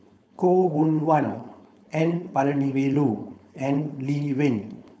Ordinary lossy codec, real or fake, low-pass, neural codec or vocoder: none; fake; none; codec, 16 kHz, 4.8 kbps, FACodec